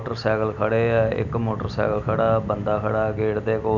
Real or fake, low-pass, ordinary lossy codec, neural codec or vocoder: real; 7.2 kHz; none; none